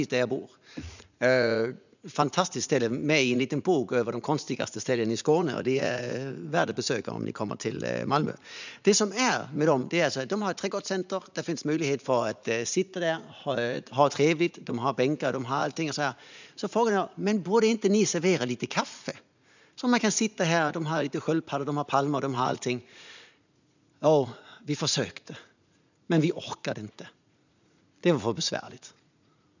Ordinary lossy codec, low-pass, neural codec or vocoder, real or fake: none; 7.2 kHz; vocoder, 44.1 kHz, 80 mel bands, Vocos; fake